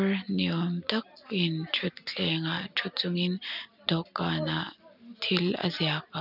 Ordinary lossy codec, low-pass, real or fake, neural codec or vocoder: none; 5.4 kHz; real; none